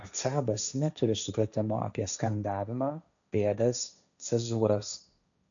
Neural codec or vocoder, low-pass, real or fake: codec, 16 kHz, 1.1 kbps, Voila-Tokenizer; 7.2 kHz; fake